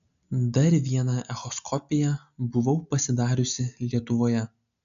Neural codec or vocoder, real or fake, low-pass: none; real; 7.2 kHz